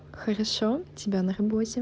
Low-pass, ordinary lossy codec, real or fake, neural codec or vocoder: none; none; real; none